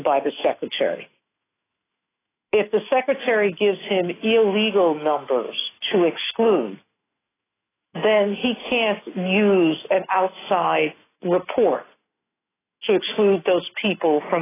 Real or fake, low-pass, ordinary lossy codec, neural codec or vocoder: fake; 3.6 kHz; AAC, 16 kbps; autoencoder, 48 kHz, 128 numbers a frame, DAC-VAE, trained on Japanese speech